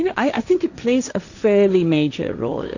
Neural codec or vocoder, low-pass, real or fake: codec, 16 kHz, 1.1 kbps, Voila-Tokenizer; 7.2 kHz; fake